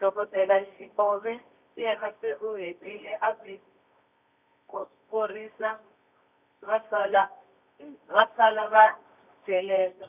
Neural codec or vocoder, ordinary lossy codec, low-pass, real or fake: codec, 24 kHz, 0.9 kbps, WavTokenizer, medium music audio release; none; 3.6 kHz; fake